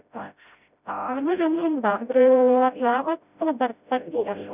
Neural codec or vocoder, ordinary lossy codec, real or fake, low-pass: codec, 16 kHz, 0.5 kbps, FreqCodec, smaller model; none; fake; 3.6 kHz